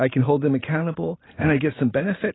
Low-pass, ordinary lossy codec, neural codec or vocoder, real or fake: 7.2 kHz; AAC, 16 kbps; vocoder, 44.1 kHz, 128 mel bands every 512 samples, BigVGAN v2; fake